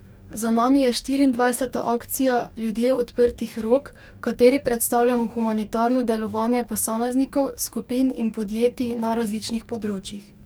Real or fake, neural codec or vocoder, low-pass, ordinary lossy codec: fake; codec, 44.1 kHz, 2.6 kbps, DAC; none; none